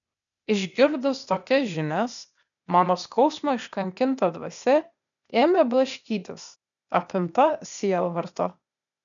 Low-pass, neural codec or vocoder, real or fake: 7.2 kHz; codec, 16 kHz, 0.8 kbps, ZipCodec; fake